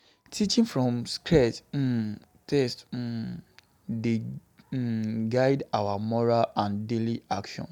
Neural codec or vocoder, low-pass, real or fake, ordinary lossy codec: none; 19.8 kHz; real; none